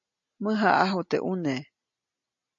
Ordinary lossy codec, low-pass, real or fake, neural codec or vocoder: MP3, 64 kbps; 7.2 kHz; real; none